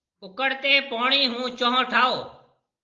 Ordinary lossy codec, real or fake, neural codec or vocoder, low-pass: Opus, 32 kbps; real; none; 7.2 kHz